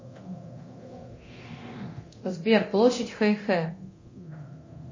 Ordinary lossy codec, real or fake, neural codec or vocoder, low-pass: MP3, 32 kbps; fake; codec, 24 kHz, 0.9 kbps, DualCodec; 7.2 kHz